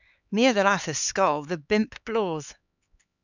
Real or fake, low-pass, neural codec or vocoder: fake; 7.2 kHz; codec, 16 kHz, 4 kbps, X-Codec, HuBERT features, trained on LibriSpeech